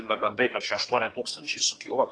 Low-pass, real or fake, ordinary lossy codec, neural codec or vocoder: 9.9 kHz; fake; AAC, 32 kbps; codec, 24 kHz, 1 kbps, SNAC